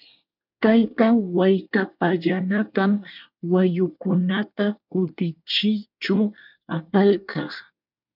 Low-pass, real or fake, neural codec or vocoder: 5.4 kHz; fake; codec, 24 kHz, 1 kbps, SNAC